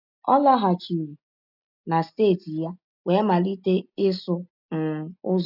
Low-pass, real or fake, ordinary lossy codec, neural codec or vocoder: 5.4 kHz; real; none; none